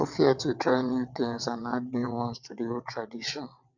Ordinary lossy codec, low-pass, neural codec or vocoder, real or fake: none; 7.2 kHz; vocoder, 22.05 kHz, 80 mel bands, WaveNeXt; fake